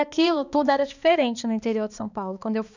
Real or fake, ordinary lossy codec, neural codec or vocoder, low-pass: fake; none; codec, 16 kHz, 2 kbps, X-Codec, HuBERT features, trained on balanced general audio; 7.2 kHz